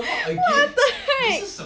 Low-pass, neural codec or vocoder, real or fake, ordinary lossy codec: none; none; real; none